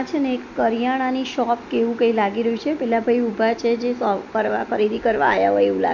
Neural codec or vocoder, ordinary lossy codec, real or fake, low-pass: none; none; real; 7.2 kHz